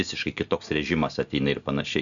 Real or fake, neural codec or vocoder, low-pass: real; none; 7.2 kHz